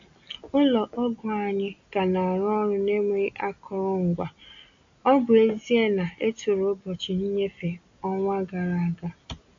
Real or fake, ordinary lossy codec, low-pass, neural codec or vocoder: real; AAC, 64 kbps; 7.2 kHz; none